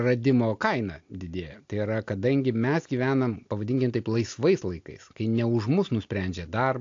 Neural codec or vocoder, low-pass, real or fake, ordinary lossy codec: none; 7.2 kHz; real; AAC, 48 kbps